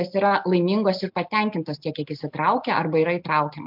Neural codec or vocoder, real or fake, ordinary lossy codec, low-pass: none; real; MP3, 48 kbps; 5.4 kHz